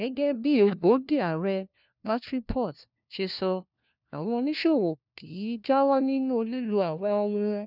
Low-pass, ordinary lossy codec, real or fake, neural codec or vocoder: 5.4 kHz; none; fake; codec, 16 kHz, 1 kbps, FunCodec, trained on LibriTTS, 50 frames a second